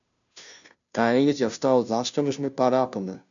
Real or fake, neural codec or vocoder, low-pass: fake; codec, 16 kHz, 0.5 kbps, FunCodec, trained on Chinese and English, 25 frames a second; 7.2 kHz